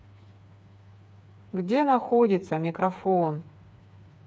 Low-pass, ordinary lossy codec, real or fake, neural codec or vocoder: none; none; fake; codec, 16 kHz, 4 kbps, FreqCodec, smaller model